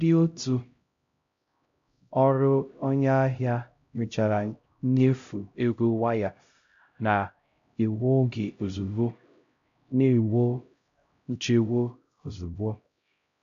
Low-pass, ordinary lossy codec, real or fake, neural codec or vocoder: 7.2 kHz; MP3, 64 kbps; fake; codec, 16 kHz, 0.5 kbps, X-Codec, HuBERT features, trained on LibriSpeech